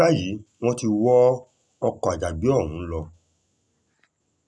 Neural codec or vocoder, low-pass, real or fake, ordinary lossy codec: none; none; real; none